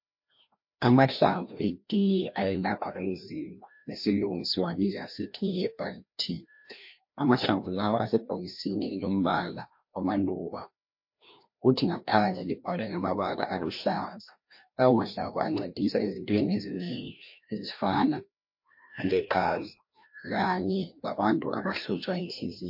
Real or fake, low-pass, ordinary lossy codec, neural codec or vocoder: fake; 5.4 kHz; MP3, 32 kbps; codec, 16 kHz, 1 kbps, FreqCodec, larger model